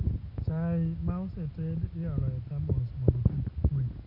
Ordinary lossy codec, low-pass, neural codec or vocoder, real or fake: none; 5.4 kHz; none; real